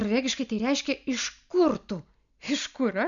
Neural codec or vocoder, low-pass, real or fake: none; 7.2 kHz; real